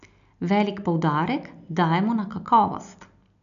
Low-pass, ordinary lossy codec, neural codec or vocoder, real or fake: 7.2 kHz; none; none; real